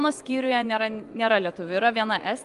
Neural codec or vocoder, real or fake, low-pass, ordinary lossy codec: vocoder, 24 kHz, 100 mel bands, Vocos; fake; 10.8 kHz; Opus, 32 kbps